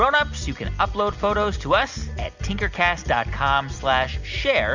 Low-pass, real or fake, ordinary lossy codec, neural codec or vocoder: 7.2 kHz; real; Opus, 64 kbps; none